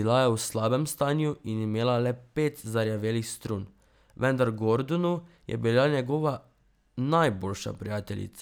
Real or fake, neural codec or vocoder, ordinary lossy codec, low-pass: real; none; none; none